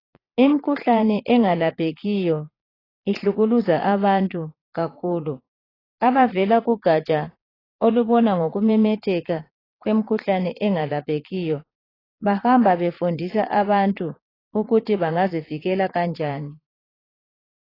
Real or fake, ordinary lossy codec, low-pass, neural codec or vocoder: fake; AAC, 24 kbps; 5.4 kHz; vocoder, 22.05 kHz, 80 mel bands, Vocos